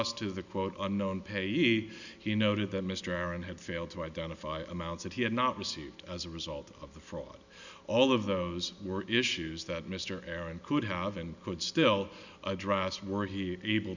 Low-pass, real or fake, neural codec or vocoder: 7.2 kHz; real; none